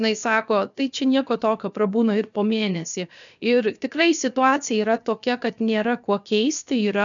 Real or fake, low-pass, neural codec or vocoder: fake; 7.2 kHz; codec, 16 kHz, 0.7 kbps, FocalCodec